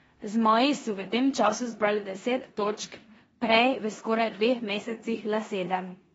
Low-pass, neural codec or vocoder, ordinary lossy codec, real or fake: 10.8 kHz; codec, 16 kHz in and 24 kHz out, 0.9 kbps, LongCat-Audio-Codec, four codebook decoder; AAC, 24 kbps; fake